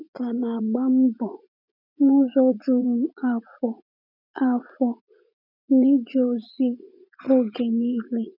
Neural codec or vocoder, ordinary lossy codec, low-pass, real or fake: vocoder, 44.1 kHz, 80 mel bands, Vocos; none; 5.4 kHz; fake